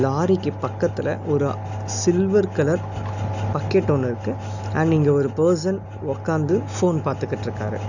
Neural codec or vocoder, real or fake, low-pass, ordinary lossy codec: none; real; 7.2 kHz; none